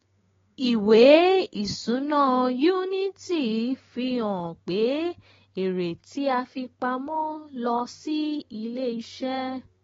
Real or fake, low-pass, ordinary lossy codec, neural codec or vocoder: fake; 7.2 kHz; AAC, 24 kbps; codec, 16 kHz, 6 kbps, DAC